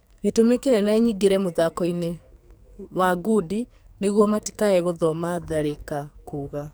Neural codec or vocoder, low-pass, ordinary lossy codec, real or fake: codec, 44.1 kHz, 2.6 kbps, SNAC; none; none; fake